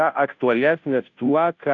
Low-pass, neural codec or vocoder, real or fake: 7.2 kHz; codec, 16 kHz, 0.5 kbps, FunCodec, trained on Chinese and English, 25 frames a second; fake